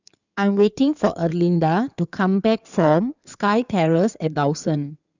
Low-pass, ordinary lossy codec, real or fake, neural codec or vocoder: 7.2 kHz; none; fake; codec, 16 kHz in and 24 kHz out, 2.2 kbps, FireRedTTS-2 codec